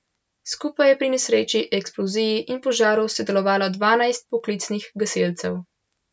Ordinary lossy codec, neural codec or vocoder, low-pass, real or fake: none; none; none; real